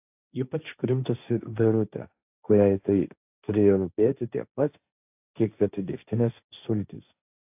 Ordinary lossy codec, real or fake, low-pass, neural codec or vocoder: AAC, 32 kbps; fake; 3.6 kHz; codec, 16 kHz, 1.1 kbps, Voila-Tokenizer